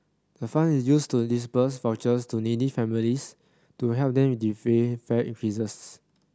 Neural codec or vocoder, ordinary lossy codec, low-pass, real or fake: none; none; none; real